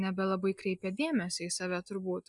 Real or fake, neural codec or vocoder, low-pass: real; none; 10.8 kHz